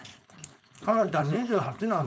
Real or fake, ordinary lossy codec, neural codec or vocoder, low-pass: fake; none; codec, 16 kHz, 4.8 kbps, FACodec; none